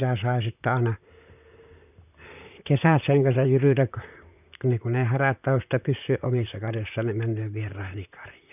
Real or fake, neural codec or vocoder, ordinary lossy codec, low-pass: fake; vocoder, 44.1 kHz, 128 mel bands, Pupu-Vocoder; none; 3.6 kHz